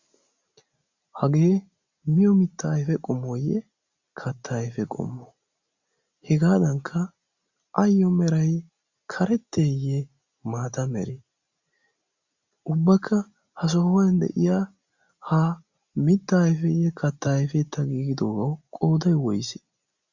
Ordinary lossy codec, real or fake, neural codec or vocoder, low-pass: Opus, 64 kbps; real; none; 7.2 kHz